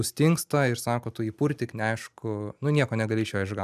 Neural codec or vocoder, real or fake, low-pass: none; real; 14.4 kHz